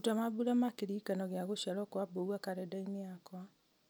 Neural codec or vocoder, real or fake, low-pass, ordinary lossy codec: none; real; none; none